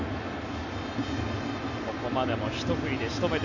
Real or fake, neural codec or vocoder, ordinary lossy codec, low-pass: real; none; none; 7.2 kHz